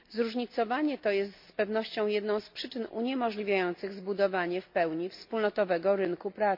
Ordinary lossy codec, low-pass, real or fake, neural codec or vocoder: MP3, 48 kbps; 5.4 kHz; real; none